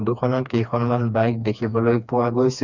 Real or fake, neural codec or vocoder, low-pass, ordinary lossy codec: fake; codec, 16 kHz, 2 kbps, FreqCodec, smaller model; 7.2 kHz; none